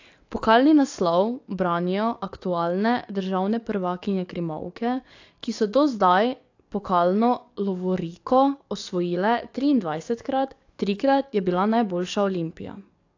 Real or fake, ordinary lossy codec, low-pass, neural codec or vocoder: fake; AAC, 48 kbps; 7.2 kHz; codec, 44.1 kHz, 7.8 kbps, DAC